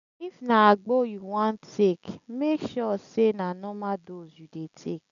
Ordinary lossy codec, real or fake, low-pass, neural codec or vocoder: none; real; 7.2 kHz; none